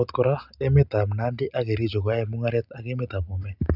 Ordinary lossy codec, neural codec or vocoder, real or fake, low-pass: none; none; real; 5.4 kHz